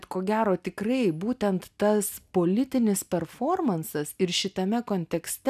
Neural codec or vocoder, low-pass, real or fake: none; 14.4 kHz; real